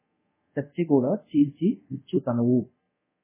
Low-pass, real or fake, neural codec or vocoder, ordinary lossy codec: 3.6 kHz; fake; codec, 24 kHz, 0.5 kbps, DualCodec; MP3, 16 kbps